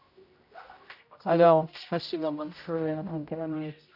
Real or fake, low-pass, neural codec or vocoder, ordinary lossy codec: fake; 5.4 kHz; codec, 16 kHz, 0.5 kbps, X-Codec, HuBERT features, trained on general audio; none